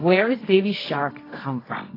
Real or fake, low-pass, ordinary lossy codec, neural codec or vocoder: fake; 5.4 kHz; AAC, 24 kbps; codec, 24 kHz, 0.9 kbps, WavTokenizer, medium music audio release